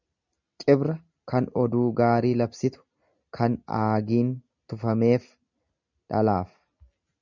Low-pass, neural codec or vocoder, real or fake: 7.2 kHz; none; real